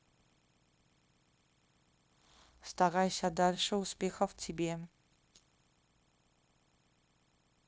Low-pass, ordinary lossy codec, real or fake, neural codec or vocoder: none; none; fake; codec, 16 kHz, 0.9 kbps, LongCat-Audio-Codec